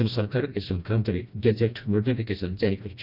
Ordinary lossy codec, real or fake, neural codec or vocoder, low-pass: none; fake; codec, 16 kHz, 1 kbps, FreqCodec, smaller model; 5.4 kHz